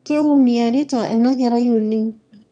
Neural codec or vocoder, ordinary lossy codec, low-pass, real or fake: autoencoder, 22.05 kHz, a latent of 192 numbers a frame, VITS, trained on one speaker; none; 9.9 kHz; fake